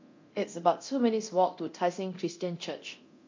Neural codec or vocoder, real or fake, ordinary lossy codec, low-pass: codec, 24 kHz, 0.9 kbps, DualCodec; fake; MP3, 48 kbps; 7.2 kHz